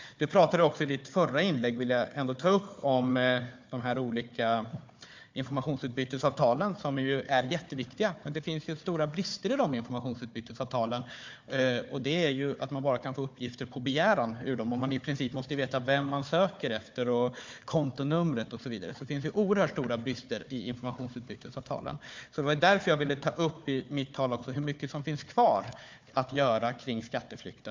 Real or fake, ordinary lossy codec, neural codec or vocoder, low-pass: fake; MP3, 64 kbps; codec, 16 kHz, 4 kbps, FunCodec, trained on Chinese and English, 50 frames a second; 7.2 kHz